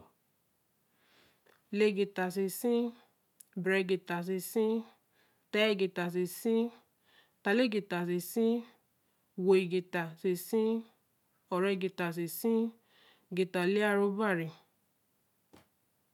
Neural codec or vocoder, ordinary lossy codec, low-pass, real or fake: none; none; 19.8 kHz; real